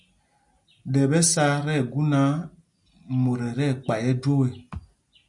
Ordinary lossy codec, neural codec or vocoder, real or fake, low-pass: MP3, 96 kbps; none; real; 10.8 kHz